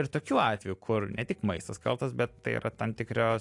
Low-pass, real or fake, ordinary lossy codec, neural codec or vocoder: 10.8 kHz; real; AAC, 64 kbps; none